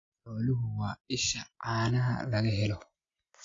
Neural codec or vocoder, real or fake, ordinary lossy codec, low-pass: none; real; AAC, 32 kbps; 7.2 kHz